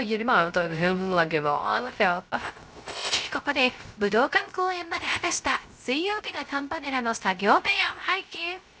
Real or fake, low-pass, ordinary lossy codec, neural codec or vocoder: fake; none; none; codec, 16 kHz, 0.3 kbps, FocalCodec